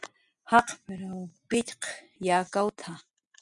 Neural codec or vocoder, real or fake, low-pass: none; real; 10.8 kHz